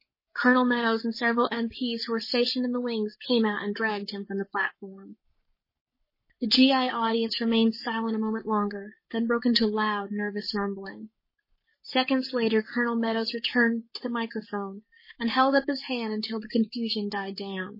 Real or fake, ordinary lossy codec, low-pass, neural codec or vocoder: fake; MP3, 24 kbps; 5.4 kHz; codec, 44.1 kHz, 7.8 kbps, Pupu-Codec